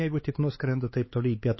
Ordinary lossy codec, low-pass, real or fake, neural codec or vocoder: MP3, 24 kbps; 7.2 kHz; fake; codec, 16 kHz, 4 kbps, X-Codec, HuBERT features, trained on LibriSpeech